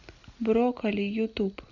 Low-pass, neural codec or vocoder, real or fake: 7.2 kHz; none; real